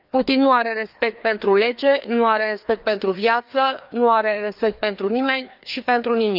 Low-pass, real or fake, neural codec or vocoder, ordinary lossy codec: 5.4 kHz; fake; codec, 16 kHz, 2 kbps, FreqCodec, larger model; none